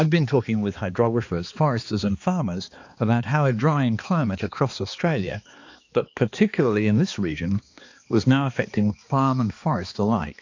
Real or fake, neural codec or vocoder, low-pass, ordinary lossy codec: fake; codec, 16 kHz, 2 kbps, X-Codec, HuBERT features, trained on balanced general audio; 7.2 kHz; AAC, 48 kbps